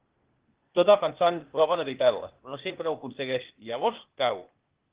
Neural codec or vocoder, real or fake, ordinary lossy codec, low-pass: codec, 16 kHz, 0.8 kbps, ZipCodec; fake; Opus, 16 kbps; 3.6 kHz